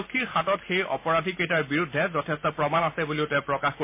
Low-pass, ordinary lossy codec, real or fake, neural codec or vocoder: 3.6 kHz; MP3, 24 kbps; real; none